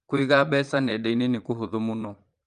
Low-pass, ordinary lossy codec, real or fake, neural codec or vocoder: 9.9 kHz; Opus, 32 kbps; fake; vocoder, 22.05 kHz, 80 mel bands, Vocos